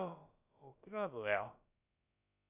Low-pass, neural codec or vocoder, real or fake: 3.6 kHz; codec, 16 kHz, about 1 kbps, DyCAST, with the encoder's durations; fake